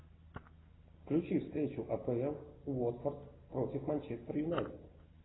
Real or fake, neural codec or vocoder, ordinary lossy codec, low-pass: real; none; AAC, 16 kbps; 7.2 kHz